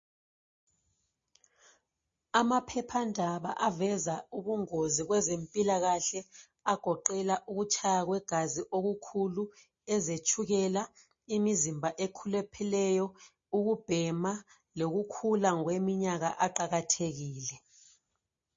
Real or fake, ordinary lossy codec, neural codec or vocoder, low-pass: real; MP3, 32 kbps; none; 7.2 kHz